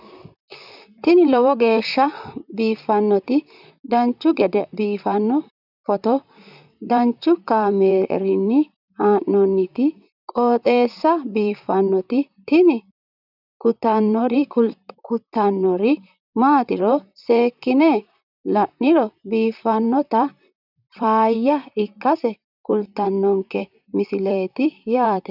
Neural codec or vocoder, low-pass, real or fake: vocoder, 44.1 kHz, 128 mel bands, Pupu-Vocoder; 5.4 kHz; fake